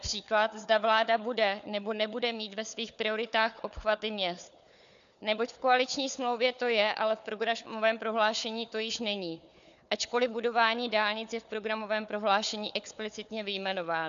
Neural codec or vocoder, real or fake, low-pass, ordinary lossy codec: codec, 16 kHz, 4 kbps, FunCodec, trained on Chinese and English, 50 frames a second; fake; 7.2 kHz; AAC, 96 kbps